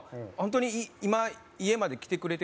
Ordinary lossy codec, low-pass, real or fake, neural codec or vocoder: none; none; real; none